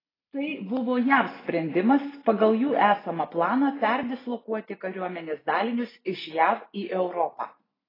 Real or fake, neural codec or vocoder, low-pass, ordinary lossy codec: real; none; 5.4 kHz; AAC, 24 kbps